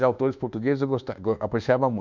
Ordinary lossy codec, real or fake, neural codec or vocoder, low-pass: none; fake; codec, 24 kHz, 1.2 kbps, DualCodec; 7.2 kHz